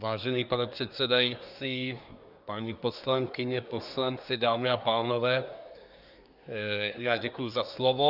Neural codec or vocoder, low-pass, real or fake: codec, 24 kHz, 1 kbps, SNAC; 5.4 kHz; fake